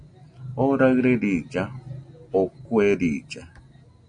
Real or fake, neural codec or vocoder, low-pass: real; none; 9.9 kHz